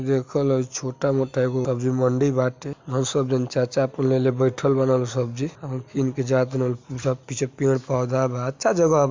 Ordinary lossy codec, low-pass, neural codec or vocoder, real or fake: MP3, 64 kbps; 7.2 kHz; none; real